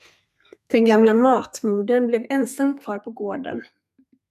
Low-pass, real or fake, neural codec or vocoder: 14.4 kHz; fake; codec, 32 kHz, 1.9 kbps, SNAC